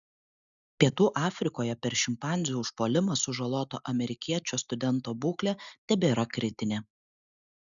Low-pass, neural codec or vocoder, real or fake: 7.2 kHz; none; real